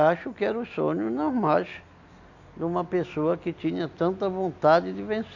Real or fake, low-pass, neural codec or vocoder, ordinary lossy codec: real; 7.2 kHz; none; none